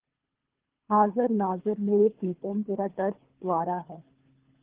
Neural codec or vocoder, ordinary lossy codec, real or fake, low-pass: codec, 24 kHz, 3 kbps, HILCodec; Opus, 16 kbps; fake; 3.6 kHz